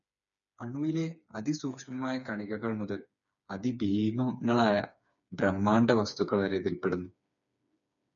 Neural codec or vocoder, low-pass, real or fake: codec, 16 kHz, 4 kbps, FreqCodec, smaller model; 7.2 kHz; fake